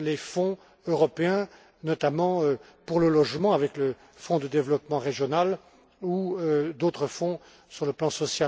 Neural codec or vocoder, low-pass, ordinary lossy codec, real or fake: none; none; none; real